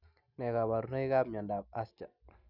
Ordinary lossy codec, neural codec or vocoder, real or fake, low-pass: none; none; real; 5.4 kHz